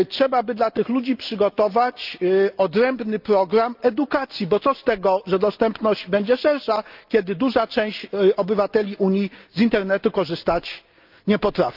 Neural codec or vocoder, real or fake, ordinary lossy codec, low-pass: none; real; Opus, 24 kbps; 5.4 kHz